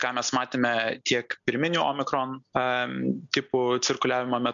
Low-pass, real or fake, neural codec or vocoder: 7.2 kHz; real; none